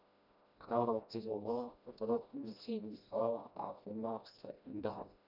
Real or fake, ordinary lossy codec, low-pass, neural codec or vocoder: fake; none; 5.4 kHz; codec, 16 kHz, 1 kbps, FreqCodec, smaller model